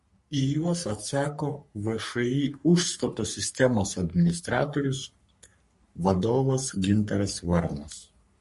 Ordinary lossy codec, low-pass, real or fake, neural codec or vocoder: MP3, 48 kbps; 14.4 kHz; fake; codec, 44.1 kHz, 3.4 kbps, Pupu-Codec